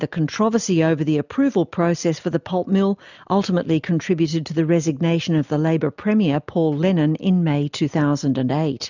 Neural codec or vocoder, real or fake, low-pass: none; real; 7.2 kHz